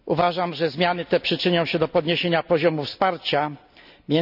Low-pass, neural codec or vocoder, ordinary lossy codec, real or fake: 5.4 kHz; none; none; real